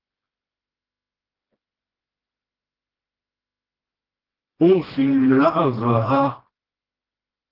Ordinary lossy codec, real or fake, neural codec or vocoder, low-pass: Opus, 16 kbps; fake; codec, 16 kHz, 1 kbps, FreqCodec, smaller model; 5.4 kHz